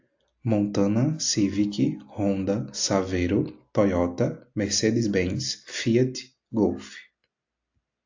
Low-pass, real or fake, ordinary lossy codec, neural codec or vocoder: 7.2 kHz; real; AAC, 48 kbps; none